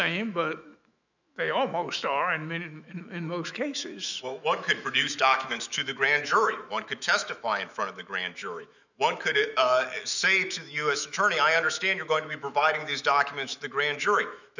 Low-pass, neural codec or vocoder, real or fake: 7.2 kHz; autoencoder, 48 kHz, 128 numbers a frame, DAC-VAE, trained on Japanese speech; fake